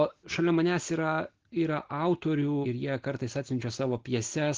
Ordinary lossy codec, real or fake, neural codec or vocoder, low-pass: Opus, 16 kbps; real; none; 7.2 kHz